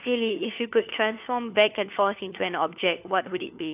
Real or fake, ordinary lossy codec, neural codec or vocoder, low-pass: fake; AAC, 32 kbps; codec, 16 kHz, 4 kbps, FunCodec, trained on LibriTTS, 50 frames a second; 3.6 kHz